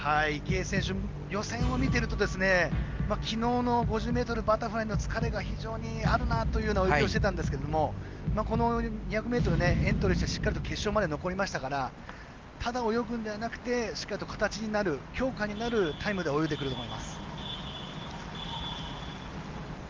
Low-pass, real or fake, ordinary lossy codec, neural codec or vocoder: 7.2 kHz; real; Opus, 32 kbps; none